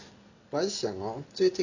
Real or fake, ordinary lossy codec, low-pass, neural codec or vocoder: real; none; 7.2 kHz; none